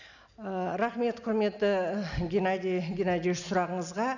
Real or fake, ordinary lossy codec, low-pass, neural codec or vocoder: real; none; 7.2 kHz; none